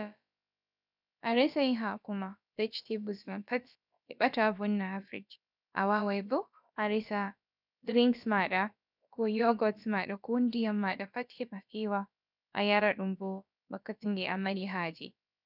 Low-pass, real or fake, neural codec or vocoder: 5.4 kHz; fake; codec, 16 kHz, about 1 kbps, DyCAST, with the encoder's durations